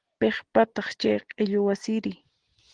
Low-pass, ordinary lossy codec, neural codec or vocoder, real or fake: 9.9 kHz; Opus, 16 kbps; none; real